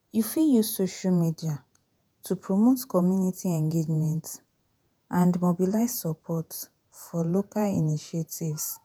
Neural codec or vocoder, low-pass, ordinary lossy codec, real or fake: vocoder, 48 kHz, 128 mel bands, Vocos; none; none; fake